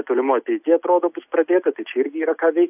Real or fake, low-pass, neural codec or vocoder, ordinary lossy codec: real; 3.6 kHz; none; AAC, 32 kbps